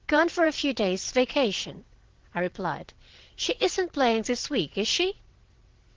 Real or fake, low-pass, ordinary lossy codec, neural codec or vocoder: fake; 7.2 kHz; Opus, 16 kbps; vocoder, 22.05 kHz, 80 mel bands, WaveNeXt